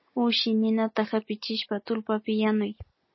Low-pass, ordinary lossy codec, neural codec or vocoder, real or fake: 7.2 kHz; MP3, 24 kbps; none; real